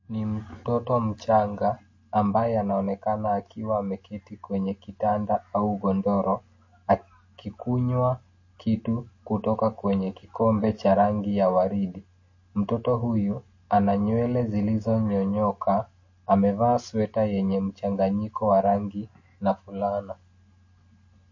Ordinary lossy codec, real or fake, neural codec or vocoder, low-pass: MP3, 32 kbps; real; none; 7.2 kHz